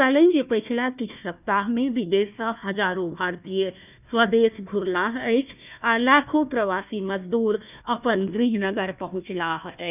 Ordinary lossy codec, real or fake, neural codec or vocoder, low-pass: none; fake; codec, 16 kHz, 1 kbps, FunCodec, trained on Chinese and English, 50 frames a second; 3.6 kHz